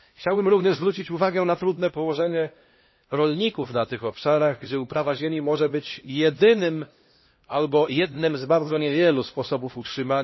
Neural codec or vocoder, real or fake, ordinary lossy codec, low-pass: codec, 16 kHz, 1 kbps, X-Codec, HuBERT features, trained on LibriSpeech; fake; MP3, 24 kbps; 7.2 kHz